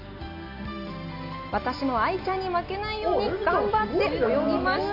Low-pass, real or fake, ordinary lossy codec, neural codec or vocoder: 5.4 kHz; real; none; none